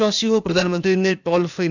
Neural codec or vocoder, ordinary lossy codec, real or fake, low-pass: codec, 16 kHz, 0.8 kbps, ZipCodec; none; fake; 7.2 kHz